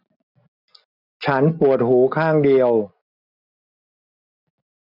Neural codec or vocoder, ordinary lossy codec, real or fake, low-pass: none; none; real; 5.4 kHz